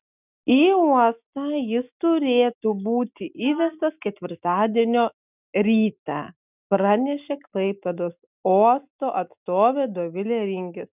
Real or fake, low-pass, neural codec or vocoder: real; 3.6 kHz; none